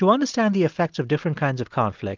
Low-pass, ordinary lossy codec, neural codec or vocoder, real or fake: 7.2 kHz; Opus, 24 kbps; none; real